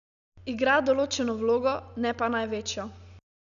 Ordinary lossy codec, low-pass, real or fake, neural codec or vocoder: none; 7.2 kHz; real; none